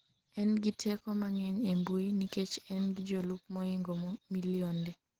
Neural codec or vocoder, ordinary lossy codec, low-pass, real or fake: none; Opus, 16 kbps; 19.8 kHz; real